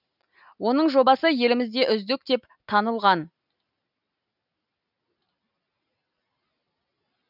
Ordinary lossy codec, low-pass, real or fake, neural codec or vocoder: none; 5.4 kHz; real; none